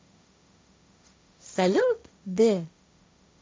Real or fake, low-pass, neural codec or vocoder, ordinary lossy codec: fake; none; codec, 16 kHz, 1.1 kbps, Voila-Tokenizer; none